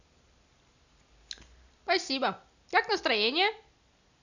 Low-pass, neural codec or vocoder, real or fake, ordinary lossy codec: 7.2 kHz; none; real; none